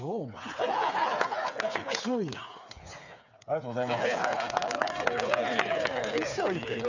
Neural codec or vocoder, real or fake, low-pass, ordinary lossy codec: codec, 16 kHz, 8 kbps, FreqCodec, smaller model; fake; 7.2 kHz; none